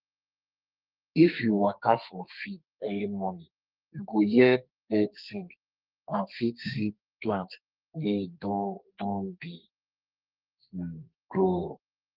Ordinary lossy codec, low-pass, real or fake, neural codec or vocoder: Opus, 32 kbps; 5.4 kHz; fake; codec, 32 kHz, 1.9 kbps, SNAC